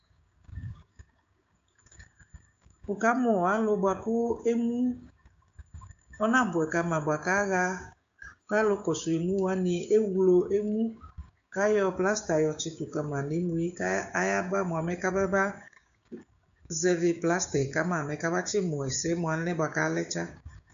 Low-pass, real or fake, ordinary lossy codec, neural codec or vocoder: 7.2 kHz; fake; AAC, 64 kbps; codec, 16 kHz, 6 kbps, DAC